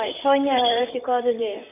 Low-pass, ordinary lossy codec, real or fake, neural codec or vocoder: 3.6 kHz; none; fake; vocoder, 44.1 kHz, 128 mel bands, Pupu-Vocoder